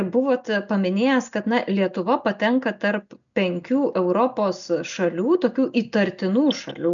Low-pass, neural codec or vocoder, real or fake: 7.2 kHz; none; real